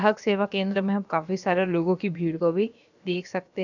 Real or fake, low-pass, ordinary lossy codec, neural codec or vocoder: fake; 7.2 kHz; none; codec, 16 kHz, about 1 kbps, DyCAST, with the encoder's durations